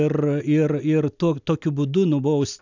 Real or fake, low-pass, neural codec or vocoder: real; 7.2 kHz; none